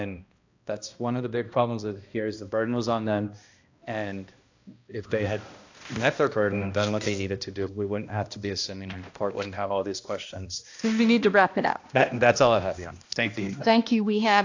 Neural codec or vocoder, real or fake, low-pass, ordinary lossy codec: codec, 16 kHz, 1 kbps, X-Codec, HuBERT features, trained on balanced general audio; fake; 7.2 kHz; AAC, 48 kbps